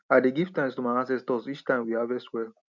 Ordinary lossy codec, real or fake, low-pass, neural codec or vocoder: none; real; 7.2 kHz; none